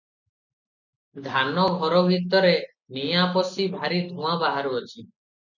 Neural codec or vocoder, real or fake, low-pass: none; real; 7.2 kHz